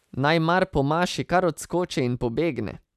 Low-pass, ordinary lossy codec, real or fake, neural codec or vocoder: 14.4 kHz; none; real; none